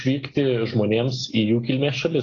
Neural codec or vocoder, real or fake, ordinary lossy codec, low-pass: none; real; AAC, 32 kbps; 7.2 kHz